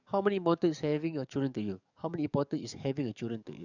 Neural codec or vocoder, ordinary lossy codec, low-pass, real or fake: codec, 44.1 kHz, 7.8 kbps, DAC; none; 7.2 kHz; fake